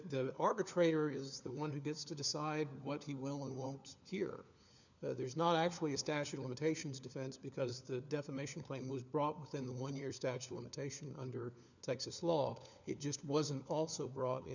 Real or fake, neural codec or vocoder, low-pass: fake; codec, 16 kHz, 4 kbps, FunCodec, trained on LibriTTS, 50 frames a second; 7.2 kHz